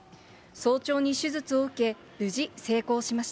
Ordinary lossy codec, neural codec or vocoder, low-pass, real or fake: none; none; none; real